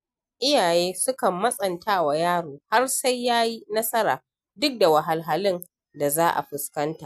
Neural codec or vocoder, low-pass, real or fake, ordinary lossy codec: none; 14.4 kHz; real; MP3, 96 kbps